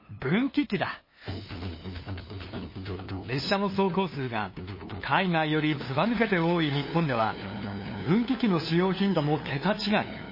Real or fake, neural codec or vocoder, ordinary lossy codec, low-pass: fake; codec, 16 kHz, 2 kbps, FunCodec, trained on LibriTTS, 25 frames a second; MP3, 24 kbps; 5.4 kHz